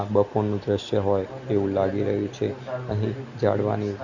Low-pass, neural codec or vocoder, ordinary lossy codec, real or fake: 7.2 kHz; none; none; real